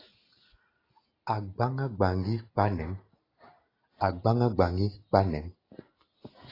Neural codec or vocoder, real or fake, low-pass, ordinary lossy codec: none; real; 5.4 kHz; AAC, 24 kbps